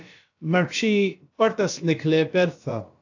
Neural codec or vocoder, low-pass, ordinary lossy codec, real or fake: codec, 16 kHz, about 1 kbps, DyCAST, with the encoder's durations; 7.2 kHz; AAC, 48 kbps; fake